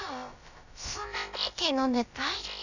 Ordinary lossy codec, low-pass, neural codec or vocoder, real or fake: none; 7.2 kHz; codec, 16 kHz, about 1 kbps, DyCAST, with the encoder's durations; fake